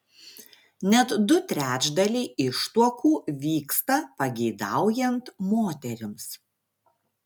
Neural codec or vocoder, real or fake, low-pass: none; real; 19.8 kHz